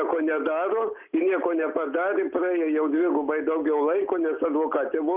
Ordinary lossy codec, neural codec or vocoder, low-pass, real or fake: Opus, 32 kbps; none; 3.6 kHz; real